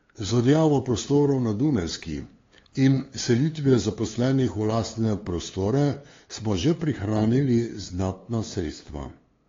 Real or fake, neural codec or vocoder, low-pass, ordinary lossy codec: fake; codec, 16 kHz, 2 kbps, FunCodec, trained on LibriTTS, 25 frames a second; 7.2 kHz; AAC, 32 kbps